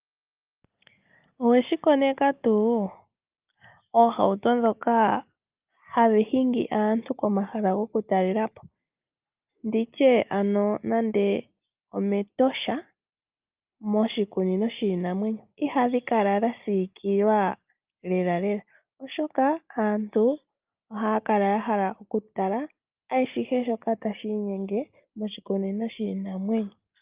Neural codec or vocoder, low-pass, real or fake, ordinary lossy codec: none; 3.6 kHz; real; Opus, 24 kbps